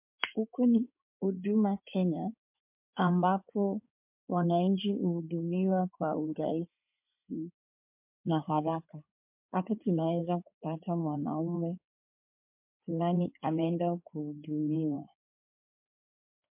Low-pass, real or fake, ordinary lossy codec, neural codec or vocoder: 3.6 kHz; fake; MP3, 32 kbps; codec, 16 kHz in and 24 kHz out, 2.2 kbps, FireRedTTS-2 codec